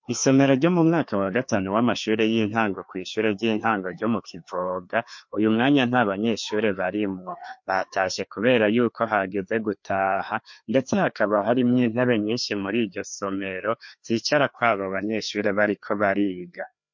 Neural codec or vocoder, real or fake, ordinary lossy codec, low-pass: codec, 16 kHz, 2 kbps, FreqCodec, larger model; fake; MP3, 48 kbps; 7.2 kHz